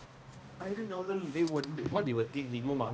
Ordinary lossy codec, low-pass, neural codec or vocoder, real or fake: none; none; codec, 16 kHz, 1 kbps, X-Codec, HuBERT features, trained on balanced general audio; fake